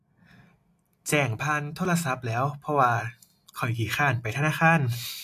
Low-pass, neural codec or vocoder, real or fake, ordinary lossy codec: 14.4 kHz; none; real; AAC, 64 kbps